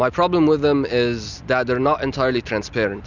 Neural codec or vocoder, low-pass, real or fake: none; 7.2 kHz; real